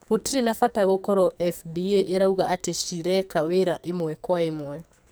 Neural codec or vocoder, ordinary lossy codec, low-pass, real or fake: codec, 44.1 kHz, 2.6 kbps, SNAC; none; none; fake